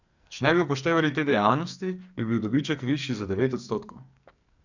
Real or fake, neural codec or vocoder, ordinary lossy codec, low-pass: fake; codec, 44.1 kHz, 2.6 kbps, SNAC; none; 7.2 kHz